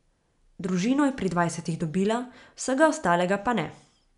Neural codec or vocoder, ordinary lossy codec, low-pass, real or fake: none; none; 10.8 kHz; real